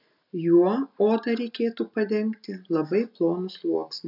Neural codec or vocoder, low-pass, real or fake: none; 5.4 kHz; real